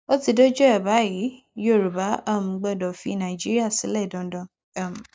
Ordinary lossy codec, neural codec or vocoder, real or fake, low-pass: none; none; real; none